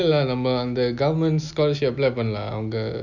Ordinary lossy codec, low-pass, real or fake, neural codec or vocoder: none; 7.2 kHz; real; none